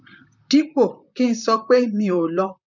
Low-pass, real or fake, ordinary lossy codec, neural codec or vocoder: 7.2 kHz; fake; none; vocoder, 44.1 kHz, 128 mel bands, Pupu-Vocoder